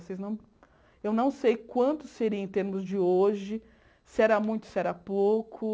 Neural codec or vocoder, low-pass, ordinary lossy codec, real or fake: none; none; none; real